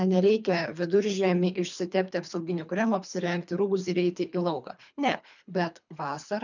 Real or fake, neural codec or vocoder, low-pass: fake; codec, 24 kHz, 3 kbps, HILCodec; 7.2 kHz